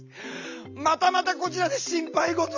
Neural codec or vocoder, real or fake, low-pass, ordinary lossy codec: none; real; 7.2 kHz; Opus, 64 kbps